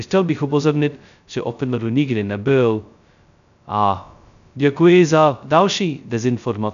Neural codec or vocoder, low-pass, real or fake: codec, 16 kHz, 0.2 kbps, FocalCodec; 7.2 kHz; fake